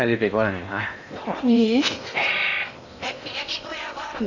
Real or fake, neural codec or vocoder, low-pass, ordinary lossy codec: fake; codec, 16 kHz in and 24 kHz out, 0.8 kbps, FocalCodec, streaming, 65536 codes; 7.2 kHz; none